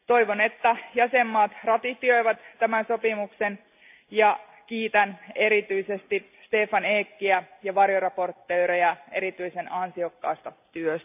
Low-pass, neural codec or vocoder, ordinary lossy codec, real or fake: 3.6 kHz; none; AAC, 32 kbps; real